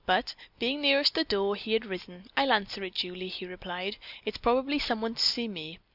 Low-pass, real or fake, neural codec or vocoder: 5.4 kHz; real; none